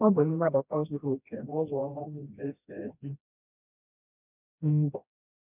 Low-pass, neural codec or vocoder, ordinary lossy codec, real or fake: 3.6 kHz; codec, 16 kHz, 1 kbps, FreqCodec, smaller model; none; fake